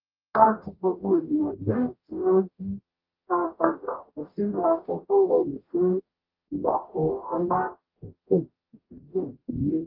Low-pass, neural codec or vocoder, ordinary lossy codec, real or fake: 5.4 kHz; codec, 44.1 kHz, 0.9 kbps, DAC; Opus, 32 kbps; fake